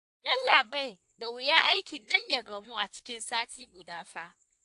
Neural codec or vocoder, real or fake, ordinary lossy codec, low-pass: codec, 24 kHz, 1 kbps, SNAC; fake; AAC, 64 kbps; 10.8 kHz